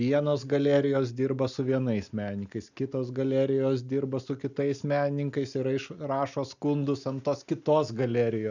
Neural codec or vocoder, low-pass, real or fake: none; 7.2 kHz; real